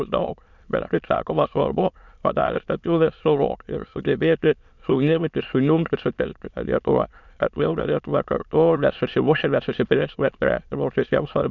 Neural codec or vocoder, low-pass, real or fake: autoencoder, 22.05 kHz, a latent of 192 numbers a frame, VITS, trained on many speakers; 7.2 kHz; fake